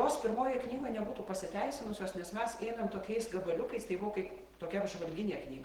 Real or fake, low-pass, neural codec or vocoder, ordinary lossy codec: fake; 19.8 kHz; vocoder, 48 kHz, 128 mel bands, Vocos; Opus, 16 kbps